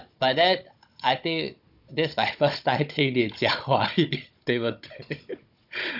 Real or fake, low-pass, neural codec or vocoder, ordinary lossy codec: fake; 5.4 kHz; codec, 16 kHz, 16 kbps, FunCodec, trained on Chinese and English, 50 frames a second; none